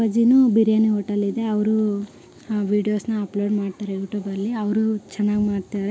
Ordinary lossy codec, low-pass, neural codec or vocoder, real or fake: none; none; none; real